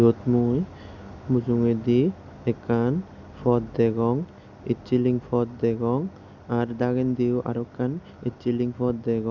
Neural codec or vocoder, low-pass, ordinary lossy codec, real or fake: none; 7.2 kHz; none; real